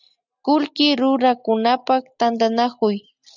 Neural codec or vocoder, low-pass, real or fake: none; 7.2 kHz; real